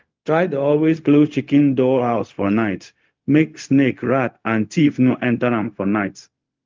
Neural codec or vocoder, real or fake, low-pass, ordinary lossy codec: codec, 16 kHz, 0.4 kbps, LongCat-Audio-Codec; fake; 7.2 kHz; Opus, 24 kbps